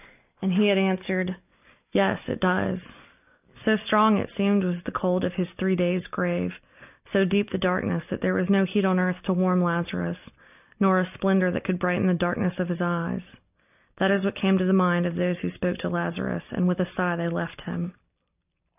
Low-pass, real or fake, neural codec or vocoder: 3.6 kHz; real; none